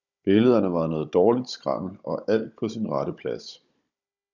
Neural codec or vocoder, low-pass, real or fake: codec, 16 kHz, 16 kbps, FunCodec, trained on Chinese and English, 50 frames a second; 7.2 kHz; fake